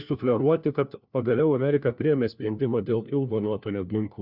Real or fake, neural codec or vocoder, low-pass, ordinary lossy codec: fake; codec, 16 kHz, 1 kbps, FunCodec, trained on LibriTTS, 50 frames a second; 5.4 kHz; Opus, 64 kbps